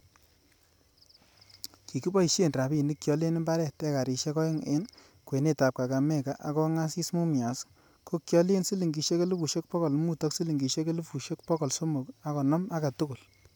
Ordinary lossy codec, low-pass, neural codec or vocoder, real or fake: none; none; none; real